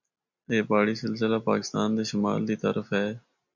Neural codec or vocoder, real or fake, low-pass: none; real; 7.2 kHz